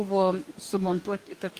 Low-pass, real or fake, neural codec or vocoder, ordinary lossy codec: 14.4 kHz; fake; codec, 44.1 kHz, 2.6 kbps, DAC; Opus, 32 kbps